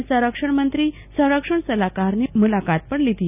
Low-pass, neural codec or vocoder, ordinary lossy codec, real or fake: 3.6 kHz; none; none; real